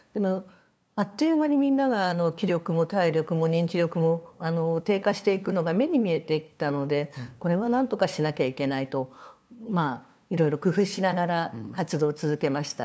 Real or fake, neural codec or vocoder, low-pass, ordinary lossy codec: fake; codec, 16 kHz, 2 kbps, FunCodec, trained on LibriTTS, 25 frames a second; none; none